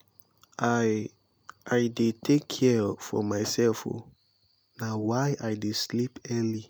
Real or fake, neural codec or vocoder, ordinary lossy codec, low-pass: real; none; none; none